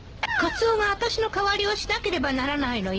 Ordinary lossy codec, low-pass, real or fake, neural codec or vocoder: Opus, 16 kbps; 7.2 kHz; real; none